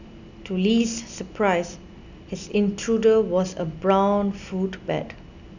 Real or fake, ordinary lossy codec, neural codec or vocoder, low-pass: real; none; none; 7.2 kHz